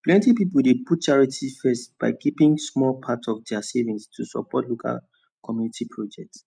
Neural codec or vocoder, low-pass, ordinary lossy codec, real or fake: none; 9.9 kHz; none; real